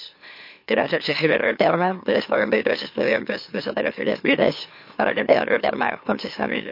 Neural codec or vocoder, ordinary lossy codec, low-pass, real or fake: autoencoder, 44.1 kHz, a latent of 192 numbers a frame, MeloTTS; MP3, 32 kbps; 5.4 kHz; fake